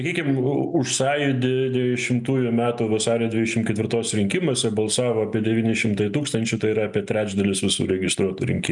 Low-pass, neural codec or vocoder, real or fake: 10.8 kHz; none; real